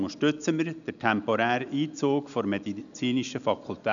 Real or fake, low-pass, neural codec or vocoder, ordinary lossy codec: real; 7.2 kHz; none; MP3, 96 kbps